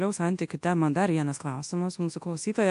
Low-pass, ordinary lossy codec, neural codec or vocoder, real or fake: 10.8 kHz; AAC, 64 kbps; codec, 24 kHz, 0.9 kbps, WavTokenizer, large speech release; fake